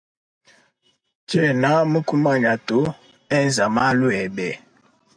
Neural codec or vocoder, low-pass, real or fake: none; 9.9 kHz; real